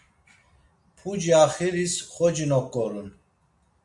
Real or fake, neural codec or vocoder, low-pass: real; none; 10.8 kHz